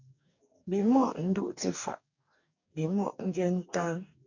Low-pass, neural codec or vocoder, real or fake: 7.2 kHz; codec, 44.1 kHz, 2.6 kbps, DAC; fake